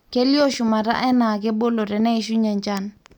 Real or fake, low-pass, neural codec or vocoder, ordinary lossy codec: real; 19.8 kHz; none; none